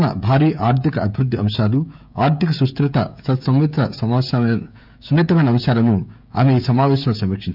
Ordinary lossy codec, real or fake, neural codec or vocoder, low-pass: none; fake; codec, 16 kHz, 16 kbps, FreqCodec, smaller model; 5.4 kHz